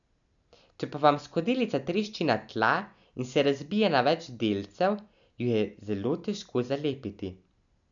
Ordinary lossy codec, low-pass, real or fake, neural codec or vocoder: none; 7.2 kHz; real; none